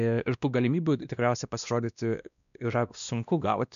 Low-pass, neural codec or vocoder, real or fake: 7.2 kHz; codec, 16 kHz, 1 kbps, X-Codec, WavLM features, trained on Multilingual LibriSpeech; fake